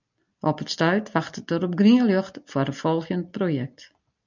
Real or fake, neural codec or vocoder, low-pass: real; none; 7.2 kHz